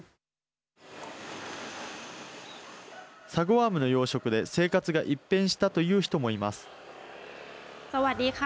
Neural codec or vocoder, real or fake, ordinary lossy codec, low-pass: none; real; none; none